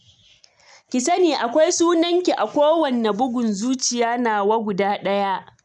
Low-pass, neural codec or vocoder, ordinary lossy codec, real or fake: 10.8 kHz; none; none; real